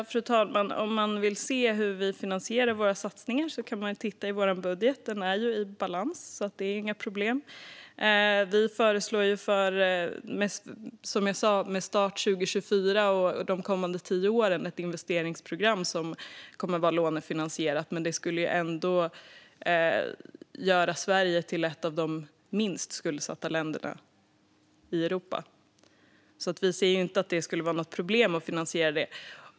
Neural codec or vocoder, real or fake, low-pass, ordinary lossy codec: none; real; none; none